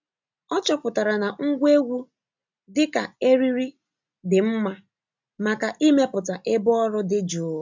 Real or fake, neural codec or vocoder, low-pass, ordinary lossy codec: real; none; 7.2 kHz; MP3, 64 kbps